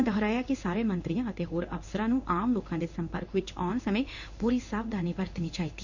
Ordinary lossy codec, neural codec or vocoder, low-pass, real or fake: none; codec, 16 kHz in and 24 kHz out, 1 kbps, XY-Tokenizer; 7.2 kHz; fake